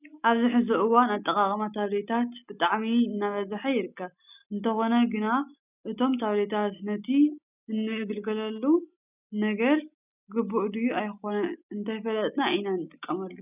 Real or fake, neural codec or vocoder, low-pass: real; none; 3.6 kHz